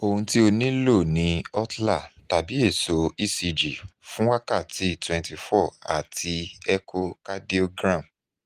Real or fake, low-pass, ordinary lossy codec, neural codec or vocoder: real; 14.4 kHz; Opus, 24 kbps; none